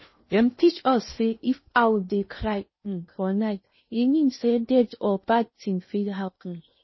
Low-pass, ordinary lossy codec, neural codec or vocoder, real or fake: 7.2 kHz; MP3, 24 kbps; codec, 16 kHz in and 24 kHz out, 0.6 kbps, FocalCodec, streaming, 2048 codes; fake